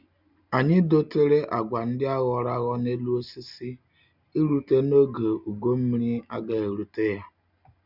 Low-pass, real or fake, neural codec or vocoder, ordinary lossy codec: 5.4 kHz; real; none; none